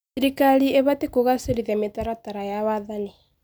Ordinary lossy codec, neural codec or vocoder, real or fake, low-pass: none; none; real; none